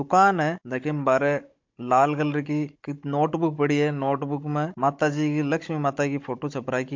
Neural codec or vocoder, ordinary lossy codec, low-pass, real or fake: codec, 16 kHz, 16 kbps, FunCodec, trained on Chinese and English, 50 frames a second; MP3, 48 kbps; 7.2 kHz; fake